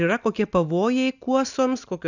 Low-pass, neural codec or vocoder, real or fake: 7.2 kHz; none; real